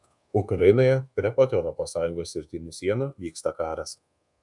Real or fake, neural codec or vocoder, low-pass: fake; codec, 24 kHz, 1.2 kbps, DualCodec; 10.8 kHz